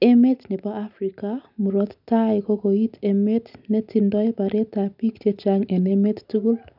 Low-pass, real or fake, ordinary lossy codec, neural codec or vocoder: 5.4 kHz; real; none; none